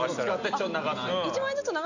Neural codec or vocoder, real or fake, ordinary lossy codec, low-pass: none; real; none; 7.2 kHz